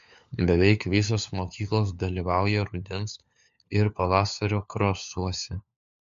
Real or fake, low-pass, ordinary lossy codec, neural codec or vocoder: fake; 7.2 kHz; MP3, 64 kbps; codec, 16 kHz, 4 kbps, FunCodec, trained on LibriTTS, 50 frames a second